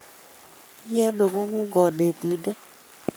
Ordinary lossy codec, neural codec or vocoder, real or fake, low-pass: none; codec, 44.1 kHz, 3.4 kbps, Pupu-Codec; fake; none